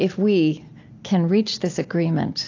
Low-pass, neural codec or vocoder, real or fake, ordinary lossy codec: 7.2 kHz; none; real; AAC, 48 kbps